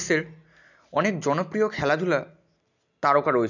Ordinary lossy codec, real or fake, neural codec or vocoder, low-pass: none; real; none; 7.2 kHz